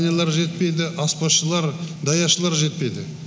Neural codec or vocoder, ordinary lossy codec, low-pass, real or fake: none; none; none; real